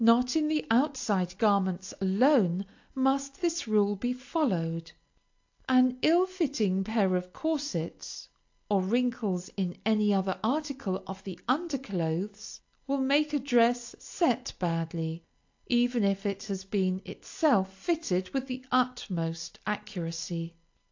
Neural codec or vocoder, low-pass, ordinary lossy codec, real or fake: none; 7.2 kHz; AAC, 48 kbps; real